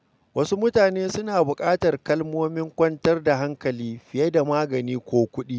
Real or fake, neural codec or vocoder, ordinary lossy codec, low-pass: real; none; none; none